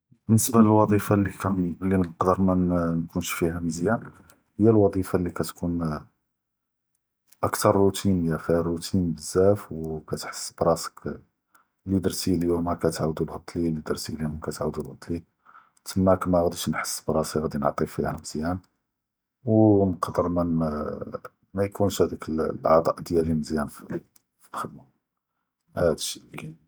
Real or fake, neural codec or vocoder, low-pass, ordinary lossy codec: real; none; none; none